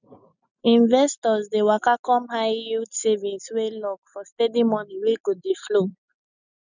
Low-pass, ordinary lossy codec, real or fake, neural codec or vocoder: 7.2 kHz; none; real; none